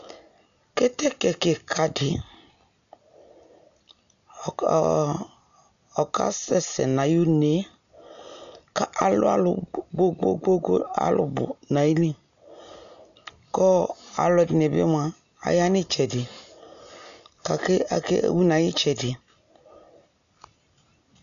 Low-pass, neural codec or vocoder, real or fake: 7.2 kHz; none; real